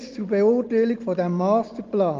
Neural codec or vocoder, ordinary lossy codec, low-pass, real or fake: none; Opus, 32 kbps; 7.2 kHz; real